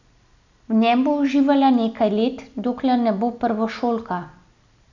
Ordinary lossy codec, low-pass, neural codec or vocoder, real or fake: none; 7.2 kHz; none; real